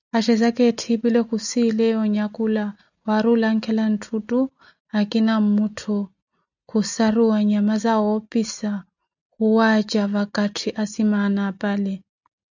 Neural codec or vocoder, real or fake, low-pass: none; real; 7.2 kHz